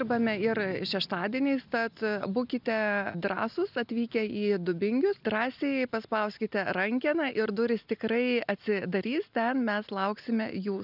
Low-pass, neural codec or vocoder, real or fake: 5.4 kHz; none; real